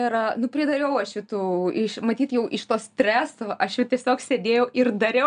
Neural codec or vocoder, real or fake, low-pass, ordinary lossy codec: none; real; 9.9 kHz; AAC, 96 kbps